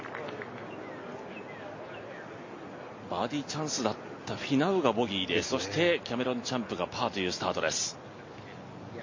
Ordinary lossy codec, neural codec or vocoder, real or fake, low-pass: MP3, 32 kbps; none; real; 7.2 kHz